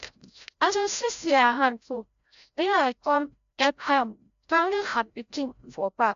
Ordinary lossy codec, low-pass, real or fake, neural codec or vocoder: MP3, 64 kbps; 7.2 kHz; fake; codec, 16 kHz, 0.5 kbps, FreqCodec, larger model